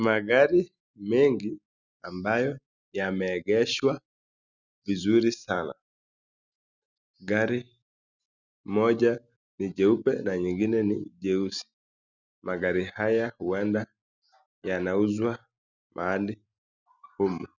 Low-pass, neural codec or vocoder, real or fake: 7.2 kHz; none; real